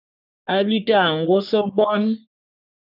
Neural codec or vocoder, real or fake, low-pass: codec, 44.1 kHz, 3.4 kbps, Pupu-Codec; fake; 5.4 kHz